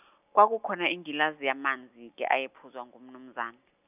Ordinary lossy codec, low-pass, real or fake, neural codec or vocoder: none; 3.6 kHz; real; none